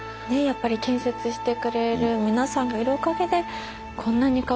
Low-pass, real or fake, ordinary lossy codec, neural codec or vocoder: none; real; none; none